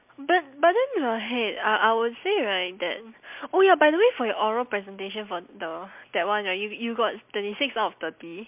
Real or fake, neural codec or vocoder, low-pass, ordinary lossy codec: real; none; 3.6 kHz; MP3, 32 kbps